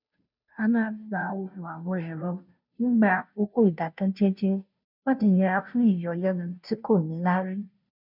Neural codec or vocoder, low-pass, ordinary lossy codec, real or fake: codec, 16 kHz, 0.5 kbps, FunCodec, trained on Chinese and English, 25 frames a second; 5.4 kHz; none; fake